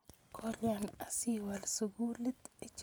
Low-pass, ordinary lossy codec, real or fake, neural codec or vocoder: none; none; real; none